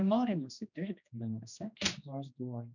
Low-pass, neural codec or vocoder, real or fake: 7.2 kHz; codec, 16 kHz, 1 kbps, X-Codec, HuBERT features, trained on general audio; fake